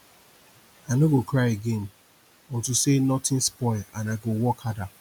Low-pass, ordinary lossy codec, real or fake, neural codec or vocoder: none; none; real; none